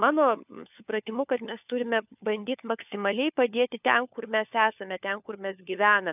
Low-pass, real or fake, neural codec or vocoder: 3.6 kHz; fake; codec, 16 kHz, 4 kbps, FunCodec, trained on LibriTTS, 50 frames a second